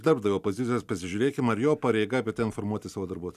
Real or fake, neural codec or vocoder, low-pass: real; none; 14.4 kHz